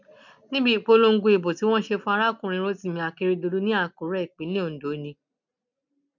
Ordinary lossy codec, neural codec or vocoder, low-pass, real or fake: none; none; 7.2 kHz; real